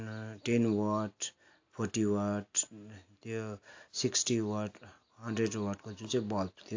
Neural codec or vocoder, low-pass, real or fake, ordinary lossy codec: none; 7.2 kHz; real; AAC, 48 kbps